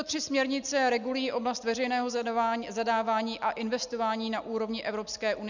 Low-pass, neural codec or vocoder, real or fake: 7.2 kHz; none; real